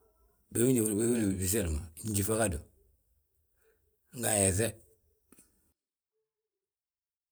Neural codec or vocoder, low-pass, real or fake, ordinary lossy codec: vocoder, 44.1 kHz, 128 mel bands every 512 samples, BigVGAN v2; none; fake; none